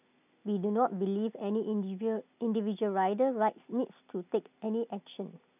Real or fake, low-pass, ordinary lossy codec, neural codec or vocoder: real; 3.6 kHz; none; none